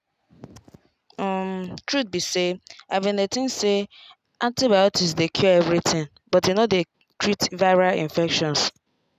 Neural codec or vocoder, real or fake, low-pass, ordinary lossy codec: none; real; 14.4 kHz; none